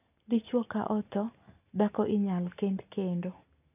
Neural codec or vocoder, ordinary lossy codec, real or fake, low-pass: none; none; real; 3.6 kHz